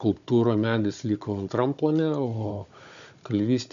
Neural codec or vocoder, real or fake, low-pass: codec, 16 kHz, 16 kbps, FunCodec, trained on Chinese and English, 50 frames a second; fake; 7.2 kHz